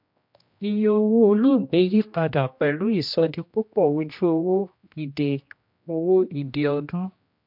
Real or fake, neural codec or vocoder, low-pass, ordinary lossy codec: fake; codec, 16 kHz, 1 kbps, X-Codec, HuBERT features, trained on general audio; 5.4 kHz; MP3, 48 kbps